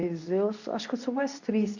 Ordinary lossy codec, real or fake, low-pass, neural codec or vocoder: none; fake; 7.2 kHz; codec, 24 kHz, 0.9 kbps, WavTokenizer, medium speech release version 2